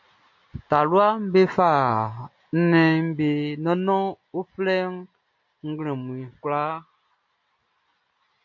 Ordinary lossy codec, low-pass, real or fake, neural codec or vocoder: MP3, 48 kbps; 7.2 kHz; real; none